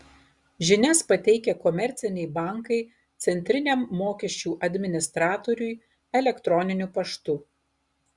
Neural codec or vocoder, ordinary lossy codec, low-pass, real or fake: none; Opus, 64 kbps; 10.8 kHz; real